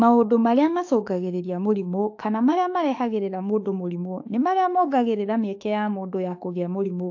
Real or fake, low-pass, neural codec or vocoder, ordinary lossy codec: fake; 7.2 kHz; autoencoder, 48 kHz, 32 numbers a frame, DAC-VAE, trained on Japanese speech; AAC, 48 kbps